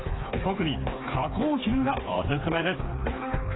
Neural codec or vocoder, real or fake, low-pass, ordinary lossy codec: codec, 16 kHz, 4 kbps, FreqCodec, smaller model; fake; 7.2 kHz; AAC, 16 kbps